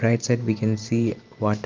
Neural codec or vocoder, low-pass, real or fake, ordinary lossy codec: vocoder, 44.1 kHz, 128 mel bands every 512 samples, BigVGAN v2; 7.2 kHz; fake; Opus, 24 kbps